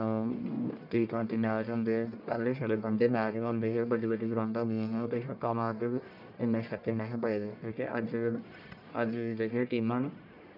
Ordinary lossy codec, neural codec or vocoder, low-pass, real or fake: none; codec, 44.1 kHz, 1.7 kbps, Pupu-Codec; 5.4 kHz; fake